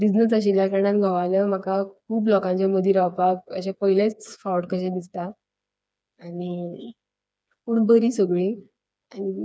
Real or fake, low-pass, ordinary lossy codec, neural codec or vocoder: fake; none; none; codec, 16 kHz, 4 kbps, FreqCodec, smaller model